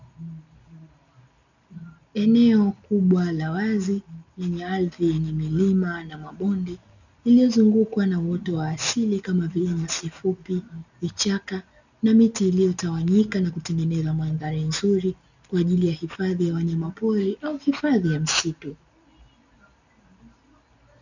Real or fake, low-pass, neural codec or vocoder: real; 7.2 kHz; none